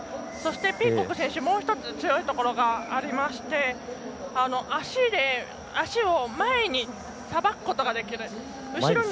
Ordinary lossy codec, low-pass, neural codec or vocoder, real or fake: none; none; none; real